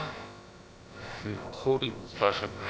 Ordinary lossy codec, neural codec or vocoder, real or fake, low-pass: none; codec, 16 kHz, about 1 kbps, DyCAST, with the encoder's durations; fake; none